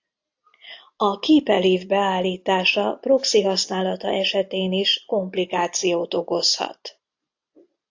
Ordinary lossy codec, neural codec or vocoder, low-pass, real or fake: AAC, 48 kbps; none; 7.2 kHz; real